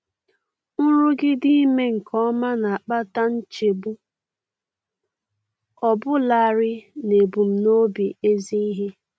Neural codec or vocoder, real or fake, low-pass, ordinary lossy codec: none; real; none; none